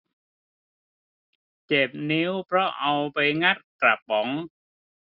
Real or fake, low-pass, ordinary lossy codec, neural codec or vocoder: real; 5.4 kHz; none; none